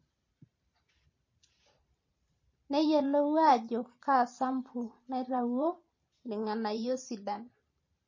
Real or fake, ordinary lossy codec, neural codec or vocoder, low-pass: fake; MP3, 32 kbps; vocoder, 44.1 kHz, 128 mel bands every 512 samples, BigVGAN v2; 7.2 kHz